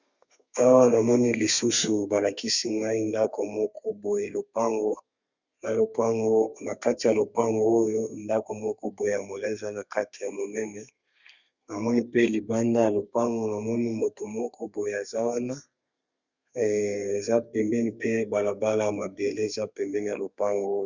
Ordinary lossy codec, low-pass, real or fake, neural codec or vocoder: Opus, 64 kbps; 7.2 kHz; fake; codec, 32 kHz, 1.9 kbps, SNAC